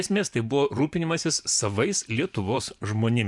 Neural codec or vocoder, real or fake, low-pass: vocoder, 24 kHz, 100 mel bands, Vocos; fake; 10.8 kHz